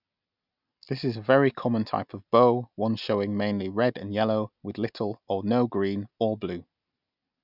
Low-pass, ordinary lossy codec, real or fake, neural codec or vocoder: 5.4 kHz; none; real; none